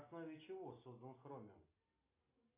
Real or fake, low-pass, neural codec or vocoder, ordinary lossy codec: real; 3.6 kHz; none; AAC, 24 kbps